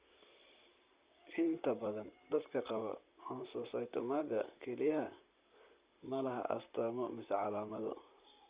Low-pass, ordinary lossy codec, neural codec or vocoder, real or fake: 3.6 kHz; Opus, 64 kbps; vocoder, 44.1 kHz, 128 mel bands, Pupu-Vocoder; fake